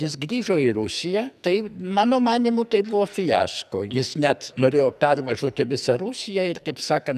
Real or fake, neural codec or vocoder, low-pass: fake; codec, 44.1 kHz, 2.6 kbps, SNAC; 14.4 kHz